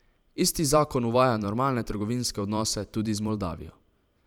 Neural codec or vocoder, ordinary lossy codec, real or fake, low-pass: vocoder, 44.1 kHz, 128 mel bands every 512 samples, BigVGAN v2; none; fake; 19.8 kHz